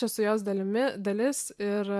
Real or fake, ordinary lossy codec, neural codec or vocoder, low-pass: fake; AAC, 96 kbps; vocoder, 44.1 kHz, 128 mel bands every 512 samples, BigVGAN v2; 14.4 kHz